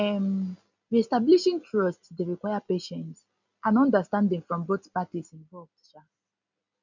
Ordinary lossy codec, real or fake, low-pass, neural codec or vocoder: none; real; 7.2 kHz; none